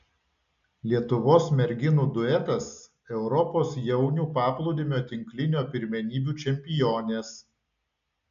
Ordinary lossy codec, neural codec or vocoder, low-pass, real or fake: MP3, 64 kbps; none; 7.2 kHz; real